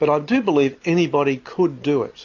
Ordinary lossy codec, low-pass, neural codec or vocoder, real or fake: AAC, 48 kbps; 7.2 kHz; none; real